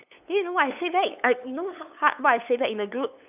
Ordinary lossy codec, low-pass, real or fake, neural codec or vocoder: none; 3.6 kHz; fake; codec, 16 kHz, 8 kbps, FunCodec, trained on LibriTTS, 25 frames a second